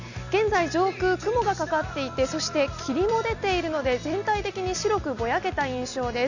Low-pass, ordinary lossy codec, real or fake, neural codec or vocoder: 7.2 kHz; none; real; none